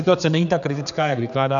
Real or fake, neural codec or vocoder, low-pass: fake; codec, 16 kHz, 4 kbps, X-Codec, HuBERT features, trained on general audio; 7.2 kHz